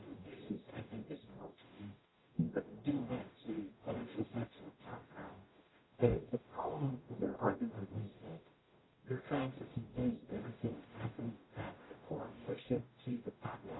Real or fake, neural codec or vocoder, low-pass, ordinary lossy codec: fake; codec, 44.1 kHz, 0.9 kbps, DAC; 7.2 kHz; AAC, 16 kbps